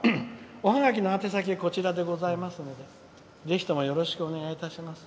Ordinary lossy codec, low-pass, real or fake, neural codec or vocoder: none; none; real; none